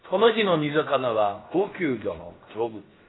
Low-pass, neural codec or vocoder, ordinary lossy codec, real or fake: 7.2 kHz; codec, 16 kHz, about 1 kbps, DyCAST, with the encoder's durations; AAC, 16 kbps; fake